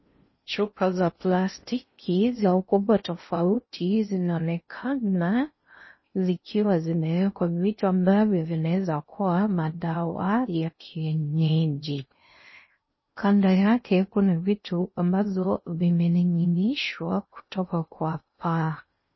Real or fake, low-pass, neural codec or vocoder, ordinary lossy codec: fake; 7.2 kHz; codec, 16 kHz in and 24 kHz out, 0.6 kbps, FocalCodec, streaming, 4096 codes; MP3, 24 kbps